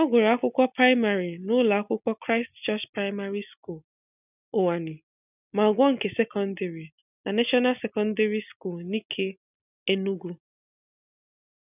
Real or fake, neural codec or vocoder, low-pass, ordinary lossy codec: real; none; 3.6 kHz; none